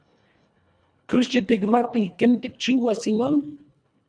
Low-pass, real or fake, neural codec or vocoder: 9.9 kHz; fake; codec, 24 kHz, 1.5 kbps, HILCodec